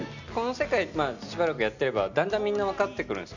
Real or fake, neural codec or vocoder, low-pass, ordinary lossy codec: real; none; 7.2 kHz; none